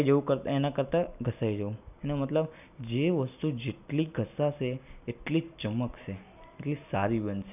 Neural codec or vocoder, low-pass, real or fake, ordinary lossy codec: none; 3.6 kHz; real; none